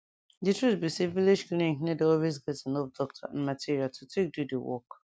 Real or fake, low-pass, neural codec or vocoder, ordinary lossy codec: real; none; none; none